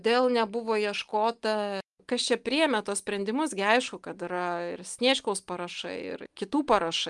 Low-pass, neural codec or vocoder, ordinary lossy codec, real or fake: 10.8 kHz; none; Opus, 32 kbps; real